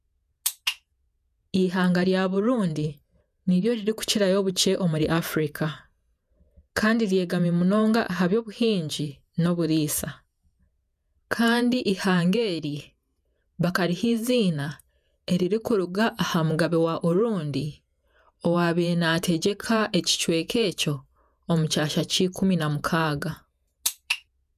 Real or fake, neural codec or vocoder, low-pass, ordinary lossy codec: fake; vocoder, 48 kHz, 128 mel bands, Vocos; 14.4 kHz; none